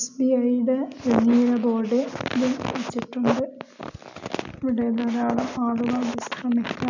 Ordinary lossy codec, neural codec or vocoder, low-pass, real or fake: none; none; 7.2 kHz; real